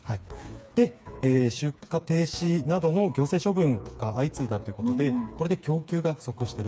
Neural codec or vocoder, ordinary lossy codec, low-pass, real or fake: codec, 16 kHz, 4 kbps, FreqCodec, smaller model; none; none; fake